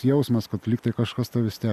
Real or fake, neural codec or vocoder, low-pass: real; none; 14.4 kHz